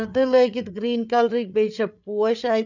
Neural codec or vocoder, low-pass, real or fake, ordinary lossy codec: codec, 16 kHz, 8 kbps, FreqCodec, larger model; 7.2 kHz; fake; none